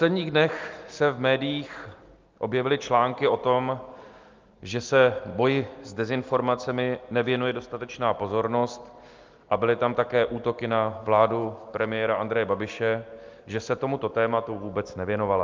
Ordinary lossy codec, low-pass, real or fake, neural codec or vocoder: Opus, 24 kbps; 7.2 kHz; real; none